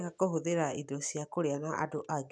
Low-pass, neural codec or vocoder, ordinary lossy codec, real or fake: 9.9 kHz; none; none; real